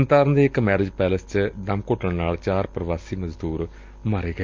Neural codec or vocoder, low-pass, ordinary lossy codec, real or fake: none; 7.2 kHz; Opus, 24 kbps; real